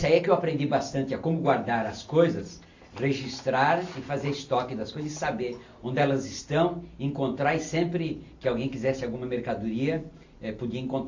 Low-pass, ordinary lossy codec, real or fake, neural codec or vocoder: 7.2 kHz; AAC, 48 kbps; real; none